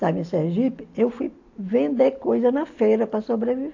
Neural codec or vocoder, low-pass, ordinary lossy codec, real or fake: none; 7.2 kHz; AAC, 48 kbps; real